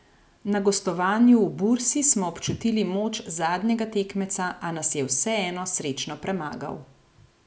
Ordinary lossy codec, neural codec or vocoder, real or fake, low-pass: none; none; real; none